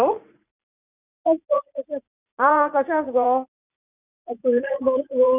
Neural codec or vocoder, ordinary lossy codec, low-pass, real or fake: vocoder, 44.1 kHz, 80 mel bands, Vocos; none; 3.6 kHz; fake